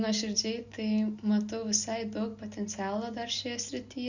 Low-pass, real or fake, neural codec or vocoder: 7.2 kHz; real; none